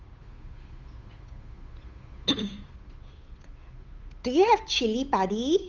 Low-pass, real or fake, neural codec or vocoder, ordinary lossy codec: 7.2 kHz; fake; codec, 16 kHz, 8 kbps, FunCodec, trained on Chinese and English, 25 frames a second; Opus, 32 kbps